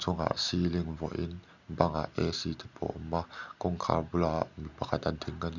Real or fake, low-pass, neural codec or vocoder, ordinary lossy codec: real; 7.2 kHz; none; none